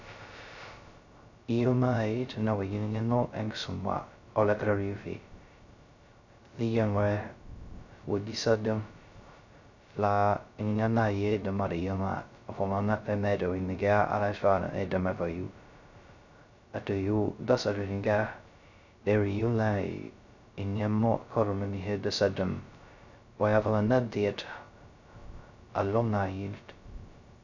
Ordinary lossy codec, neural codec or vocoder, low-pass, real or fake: none; codec, 16 kHz, 0.2 kbps, FocalCodec; 7.2 kHz; fake